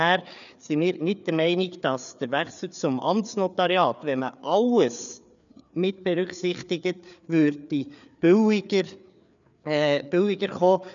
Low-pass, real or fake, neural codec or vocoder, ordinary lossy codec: 7.2 kHz; fake; codec, 16 kHz, 4 kbps, FreqCodec, larger model; none